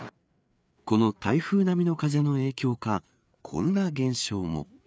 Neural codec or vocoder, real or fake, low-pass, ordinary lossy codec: codec, 16 kHz, 4 kbps, FreqCodec, larger model; fake; none; none